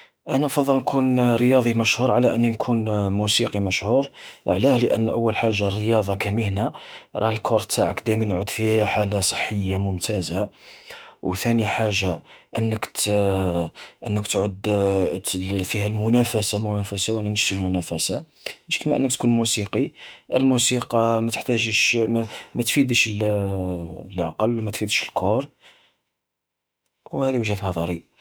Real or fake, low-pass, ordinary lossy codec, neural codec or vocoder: fake; none; none; autoencoder, 48 kHz, 32 numbers a frame, DAC-VAE, trained on Japanese speech